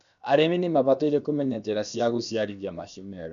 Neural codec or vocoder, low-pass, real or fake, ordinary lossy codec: codec, 16 kHz, about 1 kbps, DyCAST, with the encoder's durations; 7.2 kHz; fake; none